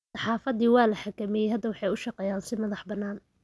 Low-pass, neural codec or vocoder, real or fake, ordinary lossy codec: none; none; real; none